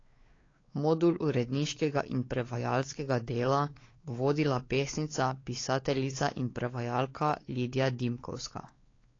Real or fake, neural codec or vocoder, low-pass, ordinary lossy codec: fake; codec, 16 kHz, 4 kbps, X-Codec, WavLM features, trained on Multilingual LibriSpeech; 7.2 kHz; AAC, 32 kbps